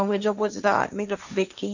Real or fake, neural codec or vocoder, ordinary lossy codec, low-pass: fake; codec, 16 kHz, 1.1 kbps, Voila-Tokenizer; none; 7.2 kHz